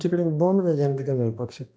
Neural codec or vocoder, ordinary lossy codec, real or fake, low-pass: codec, 16 kHz, 1 kbps, X-Codec, HuBERT features, trained on balanced general audio; none; fake; none